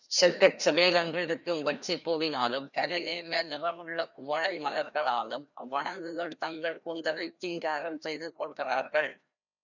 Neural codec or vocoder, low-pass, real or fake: codec, 16 kHz, 1 kbps, FreqCodec, larger model; 7.2 kHz; fake